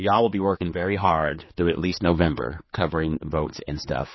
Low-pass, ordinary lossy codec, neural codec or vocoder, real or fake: 7.2 kHz; MP3, 24 kbps; codec, 16 kHz, 4 kbps, X-Codec, HuBERT features, trained on general audio; fake